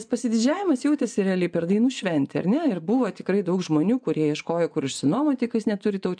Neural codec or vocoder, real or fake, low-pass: vocoder, 24 kHz, 100 mel bands, Vocos; fake; 9.9 kHz